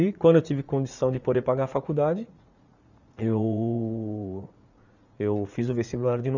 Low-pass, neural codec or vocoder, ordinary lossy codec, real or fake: 7.2 kHz; vocoder, 44.1 kHz, 80 mel bands, Vocos; none; fake